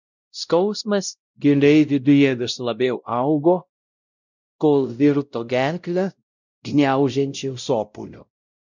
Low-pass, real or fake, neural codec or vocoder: 7.2 kHz; fake; codec, 16 kHz, 0.5 kbps, X-Codec, WavLM features, trained on Multilingual LibriSpeech